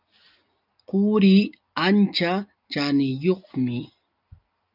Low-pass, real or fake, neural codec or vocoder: 5.4 kHz; real; none